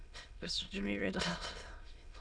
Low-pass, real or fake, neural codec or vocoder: 9.9 kHz; fake; autoencoder, 22.05 kHz, a latent of 192 numbers a frame, VITS, trained on many speakers